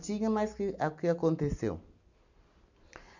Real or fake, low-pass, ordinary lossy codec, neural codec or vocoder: real; 7.2 kHz; none; none